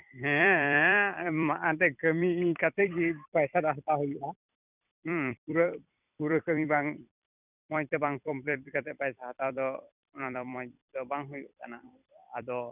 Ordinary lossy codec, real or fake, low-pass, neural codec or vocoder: none; fake; 3.6 kHz; vocoder, 44.1 kHz, 128 mel bands every 256 samples, BigVGAN v2